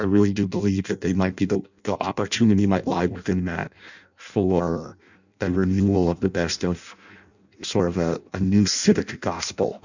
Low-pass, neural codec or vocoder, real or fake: 7.2 kHz; codec, 16 kHz in and 24 kHz out, 0.6 kbps, FireRedTTS-2 codec; fake